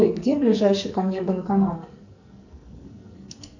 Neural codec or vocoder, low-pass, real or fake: codec, 44.1 kHz, 2.6 kbps, SNAC; 7.2 kHz; fake